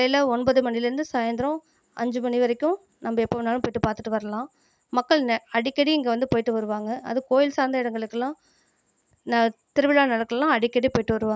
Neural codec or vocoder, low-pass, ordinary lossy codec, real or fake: none; none; none; real